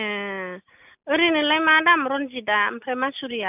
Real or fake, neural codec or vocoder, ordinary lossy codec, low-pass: real; none; none; 3.6 kHz